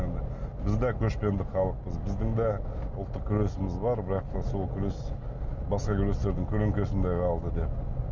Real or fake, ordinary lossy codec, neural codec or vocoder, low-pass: real; none; none; 7.2 kHz